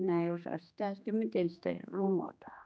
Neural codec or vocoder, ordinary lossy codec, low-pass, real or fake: codec, 16 kHz, 2 kbps, X-Codec, HuBERT features, trained on general audio; none; none; fake